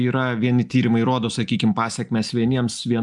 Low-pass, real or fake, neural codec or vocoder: 10.8 kHz; real; none